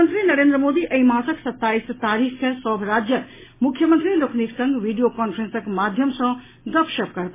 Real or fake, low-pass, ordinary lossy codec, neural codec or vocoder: real; 3.6 kHz; MP3, 16 kbps; none